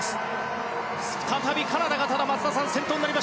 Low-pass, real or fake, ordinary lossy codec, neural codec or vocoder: none; real; none; none